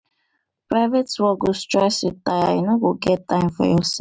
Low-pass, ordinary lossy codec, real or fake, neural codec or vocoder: none; none; real; none